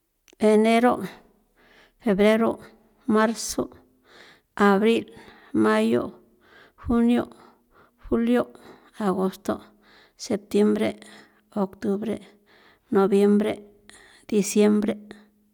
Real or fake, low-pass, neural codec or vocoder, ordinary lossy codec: real; 19.8 kHz; none; none